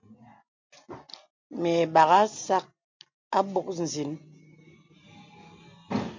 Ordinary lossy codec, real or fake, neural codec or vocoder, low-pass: MP3, 48 kbps; real; none; 7.2 kHz